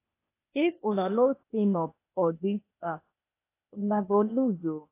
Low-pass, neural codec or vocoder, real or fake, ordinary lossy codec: 3.6 kHz; codec, 16 kHz, 0.8 kbps, ZipCodec; fake; AAC, 24 kbps